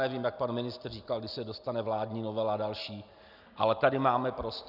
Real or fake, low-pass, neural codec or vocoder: real; 5.4 kHz; none